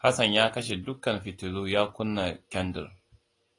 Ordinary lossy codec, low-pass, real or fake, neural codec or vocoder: AAC, 32 kbps; 10.8 kHz; real; none